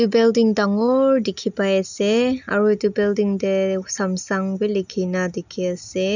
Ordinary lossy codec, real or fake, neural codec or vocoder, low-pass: none; real; none; 7.2 kHz